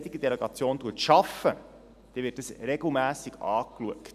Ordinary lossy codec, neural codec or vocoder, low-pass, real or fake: none; none; 14.4 kHz; real